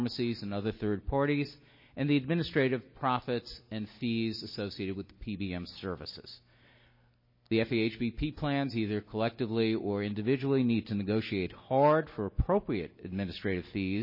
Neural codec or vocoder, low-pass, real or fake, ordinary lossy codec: none; 5.4 kHz; real; MP3, 24 kbps